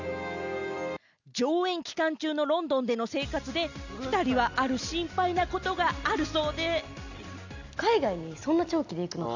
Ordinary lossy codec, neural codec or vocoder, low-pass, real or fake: none; none; 7.2 kHz; real